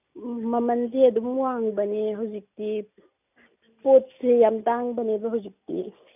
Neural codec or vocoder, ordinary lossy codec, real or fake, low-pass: none; none; real; 3.6 kHz